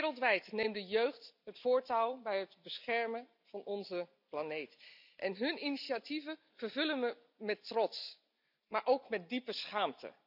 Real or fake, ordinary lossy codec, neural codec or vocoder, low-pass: real; none; none; 5.4 kHz